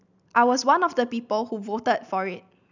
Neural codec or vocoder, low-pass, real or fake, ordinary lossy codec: none; 7.2 kHz; real; none